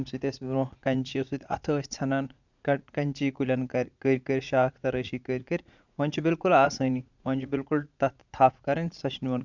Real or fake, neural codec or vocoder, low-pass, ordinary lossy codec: fake; vocoder, 44.1 kHz, 80 mel bands, Vocos; 7.2 kHz; none